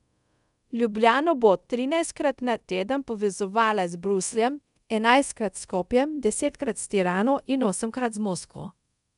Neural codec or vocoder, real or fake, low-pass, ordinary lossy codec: codec, 24 kHz, 0.5 kbps, DualCodec; fake; 10.8 kHz; none